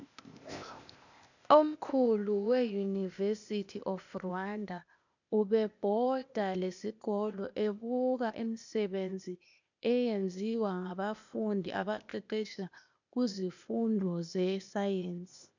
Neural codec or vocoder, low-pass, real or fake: codec, 16 kHz, 0.8 kbps, ZipCodec; 7.2 kHz; fake